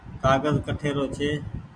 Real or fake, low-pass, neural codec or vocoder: real; 9.9 kHz; none